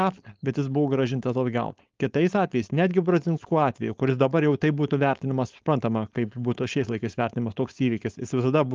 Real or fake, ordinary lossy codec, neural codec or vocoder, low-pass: fake; Opus, 32 kbps; codec, 16 kHz, 4.8 kbps, FACodec; 7.2 kHz